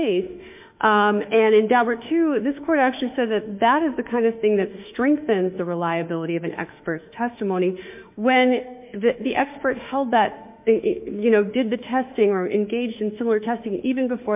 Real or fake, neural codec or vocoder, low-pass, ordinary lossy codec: fake; autoencoder, 48 kHz, 32 numbers a frame, DAC-VAE, trained on Japanese speech; 3.6 kHz; MP3, 32 kbps